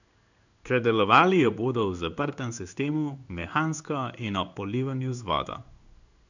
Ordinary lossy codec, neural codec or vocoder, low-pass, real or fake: none; codec, 16 kHz in and 24 kHz out, 1 kbps, XY-Tokenizer; 7.2 kHz; fake